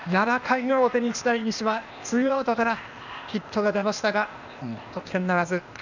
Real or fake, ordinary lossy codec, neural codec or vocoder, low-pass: fake; none; codec, 16 kHz, 0.8 kbps, ZipCodec; 7.2 kHz